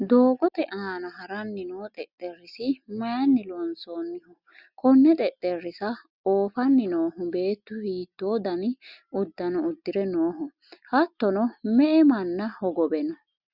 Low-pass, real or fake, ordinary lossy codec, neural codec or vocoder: 5.4 kHz; real; Opus, 64 kbps; none